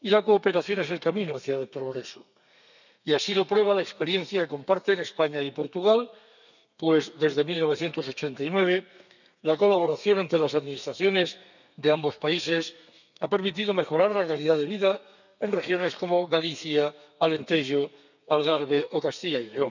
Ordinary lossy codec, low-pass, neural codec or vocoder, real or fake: none; 7.2 kHz; codec, 32 kHz, 1.9 kbps, SNAC; fake